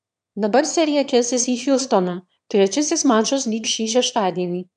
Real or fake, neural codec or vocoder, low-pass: fake; autoencoder, 22.05 kHz, a latent of 192 numbers a frame, VITS, trained on one speaker; 9.9 kHz